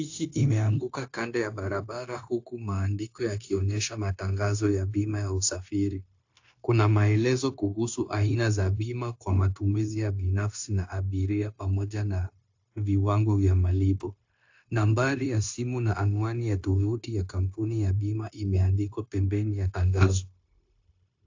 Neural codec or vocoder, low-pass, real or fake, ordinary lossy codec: codec, 16 kHz, 0.9 kbps, LongCat-Audio-Codec; 7.2 kHz; fake; AAC, 48 kbps